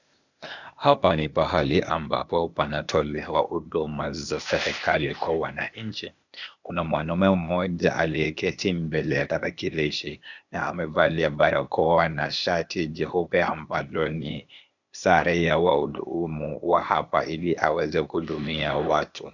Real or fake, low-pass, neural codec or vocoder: fake; 7.2 kHz; codec, 16 kHz, 0.8 kbps, ZipCodec